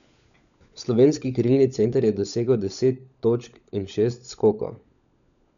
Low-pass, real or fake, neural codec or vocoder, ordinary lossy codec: 7.2 kHz; fake; codec, 16 kHz, 16 kbps, FunCodec, trained on LibriTTS, 50 frames a second; none